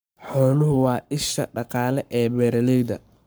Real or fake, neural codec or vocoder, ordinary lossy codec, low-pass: fake; codec, 44.1 kHz, 7.8 kbps, Pupu-Codec; none; none